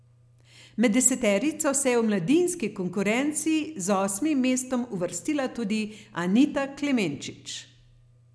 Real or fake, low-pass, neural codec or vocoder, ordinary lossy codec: real; none; none; none